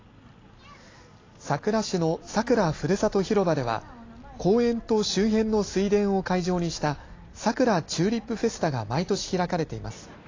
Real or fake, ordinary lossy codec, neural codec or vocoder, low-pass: real; AAC, 32 kbps; none; 7.2 kHz